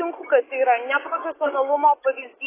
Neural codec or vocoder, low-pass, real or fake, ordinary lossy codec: autoencoder, 48 kHz, 128 numbers a frame, DAC-VAE, trained on Japanese speech; 3.6 kHz; fake; AAC, 16 kbps